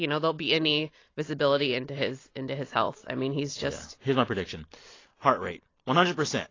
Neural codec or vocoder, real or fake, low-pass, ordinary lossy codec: none; real; 7.2 kHz; AAC, 32 kbps